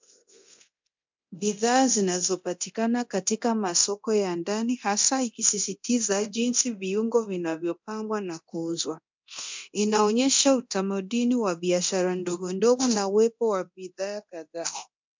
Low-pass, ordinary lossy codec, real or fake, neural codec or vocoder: 7.2 kHz; MP3, 64 kbps; fake; codec, 24 kHz, 0.9 kbps, DualCodec